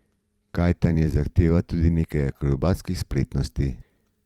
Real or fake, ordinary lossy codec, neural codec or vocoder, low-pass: fake; Opus, 32 kbps; vocoder, 44.1 kHz, 128 mel bands every 512 samples, BigVGAN v2; 19.8 kHz